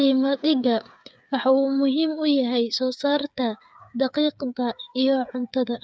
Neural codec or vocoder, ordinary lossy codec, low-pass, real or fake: codec, 16 kHz, 8 kbps, FreqCodec, smaller model; none; none; fake